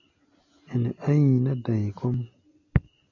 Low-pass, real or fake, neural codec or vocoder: 7.2 kHz; real; none